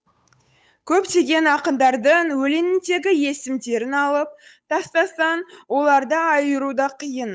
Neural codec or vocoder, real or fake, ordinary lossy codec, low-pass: codec, 16 kHz, 8 kbps, FunCodec, trained on Chinese and English, 25 frames a second; fake; none; none